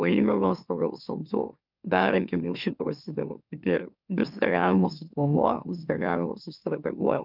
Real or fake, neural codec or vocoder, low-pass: fake; autoencoder, 44.1 kHz, a latent of 192 numbers a frame, MeloTTS; 5.4 kHz